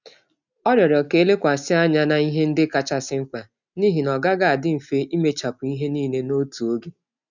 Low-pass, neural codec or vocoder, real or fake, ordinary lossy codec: 7.2 kHz; none; real; none